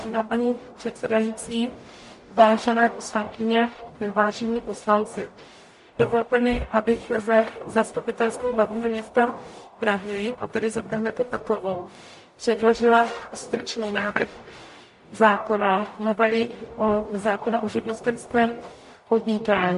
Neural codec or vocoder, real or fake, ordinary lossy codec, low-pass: codec, 44.1 kHz, 0.9 kbps, DAC; fake; MP3, 48 kbps; 14.4 kHz